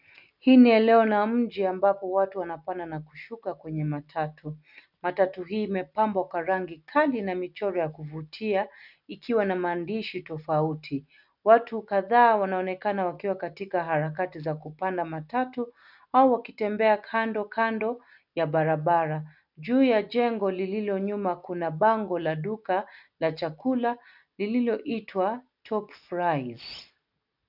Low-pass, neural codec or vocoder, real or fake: 5.4 kHz; none; real